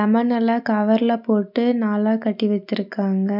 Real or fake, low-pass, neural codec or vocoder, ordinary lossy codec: real; 5.4 kHz; none; none